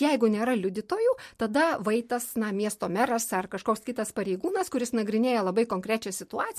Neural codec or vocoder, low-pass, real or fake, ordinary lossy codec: none; 14.4 kHz; real; MP3, 64 kbps